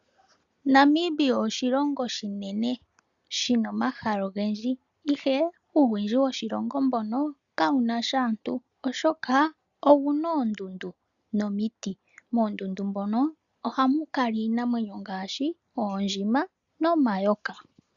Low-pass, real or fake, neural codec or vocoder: 7.2 kHz; real; none